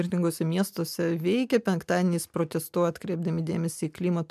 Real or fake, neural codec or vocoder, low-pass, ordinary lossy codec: real; none; 14.4 kHz; MP3, 96 kbps